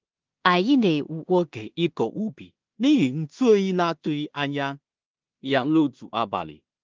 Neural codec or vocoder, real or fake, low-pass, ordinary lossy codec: codec, 16 kHz in and 24 kHz out, 0.4 kbps, LongCat-Audio-Codec, two codebook decoder; fake; 7.2 kHz; Opus, 32 kbps